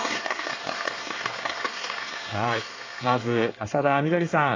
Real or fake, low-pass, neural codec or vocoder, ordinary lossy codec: fake; 7.2 kHz; codec, 24 kHz, 1 kbps, SNAC; AAC, 32 kbps